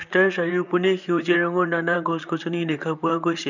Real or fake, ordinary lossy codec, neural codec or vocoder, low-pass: fake; none; vocoder, 44.1 kHz, 128 mel bands, Pupu-Vocoder; 7.2 kHz